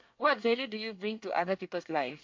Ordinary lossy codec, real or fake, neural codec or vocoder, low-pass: MP3, 48 kbps; fake; codec, 24 kHz, 1 kbps, SNAC; 7.2 kHz